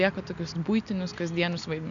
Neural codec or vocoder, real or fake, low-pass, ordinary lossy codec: none; real; 7.2 kHz; MP3, 96 kbps